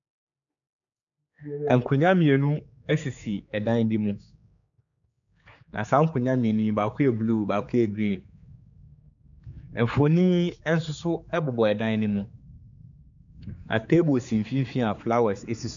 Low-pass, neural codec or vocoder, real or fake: 7.2 kHz; codec, 16 kHz, 4 kbps, X-Codec, HuBERT features, trained on general audio; fake